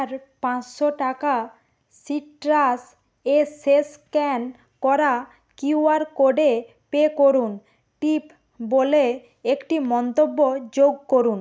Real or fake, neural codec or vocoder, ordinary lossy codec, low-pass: real; none; none; none